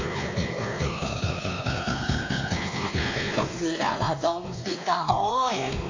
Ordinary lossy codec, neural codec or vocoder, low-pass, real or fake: AAC, 48 kbps; codec, 16 kHz, 2 kbps, X-Codec, WavLM features, trained on Multilingual LibriSpeech; 7.2 kHz; fake